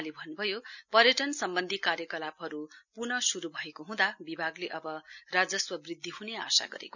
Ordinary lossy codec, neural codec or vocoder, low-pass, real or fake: none; none; 7.2 kHz; real